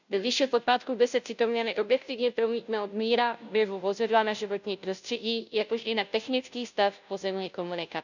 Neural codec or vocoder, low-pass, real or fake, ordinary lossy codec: codec, 16 kHz, 0.5 kbps, FunCodec, trained on Chinese and English, 25 frames a second; 7.2 kHz; fake; none